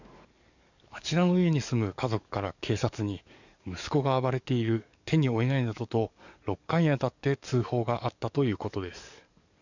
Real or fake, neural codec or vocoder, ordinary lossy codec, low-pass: fake; codec, 44.1 kHz, 7.8 kbps, DAC; none; 7.2 kHz